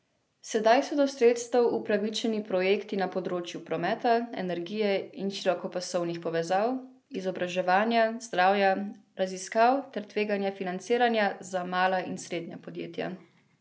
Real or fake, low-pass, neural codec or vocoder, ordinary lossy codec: real; none; none; none